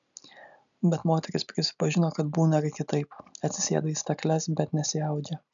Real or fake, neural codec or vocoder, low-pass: real; none; 7.2 kHz